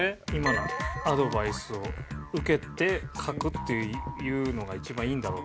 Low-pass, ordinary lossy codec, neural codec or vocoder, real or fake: none; none; none; real